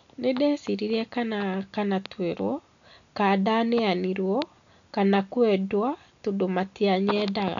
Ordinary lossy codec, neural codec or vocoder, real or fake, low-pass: none; none; real; 7.2 kHz